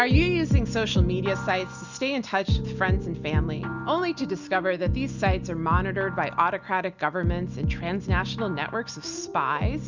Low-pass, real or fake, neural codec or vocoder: 7.2 kHz; real; none